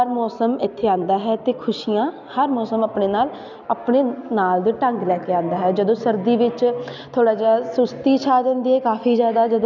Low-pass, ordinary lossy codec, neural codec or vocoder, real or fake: 7.2 kHz; none; none; real